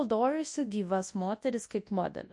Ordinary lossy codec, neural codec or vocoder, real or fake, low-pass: MP3, 48 kbps; codec, 24 kHz, 0.9 kbps, WavTokenizer, large speech release; fake; 10.8 kHz